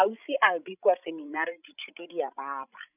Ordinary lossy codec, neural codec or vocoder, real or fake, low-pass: none; codec, 16 kHz, 16 kbps, FreqCodec, larger model; fake; 3.6 kHz